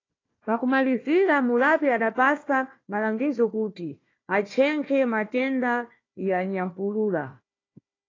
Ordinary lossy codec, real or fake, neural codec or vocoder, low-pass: AAC, 32 kbps; fake; codec, 16 kHz, 1 kbps, FunCodec, trained on Chinese and English, 50 frames a second; 7.2 kHz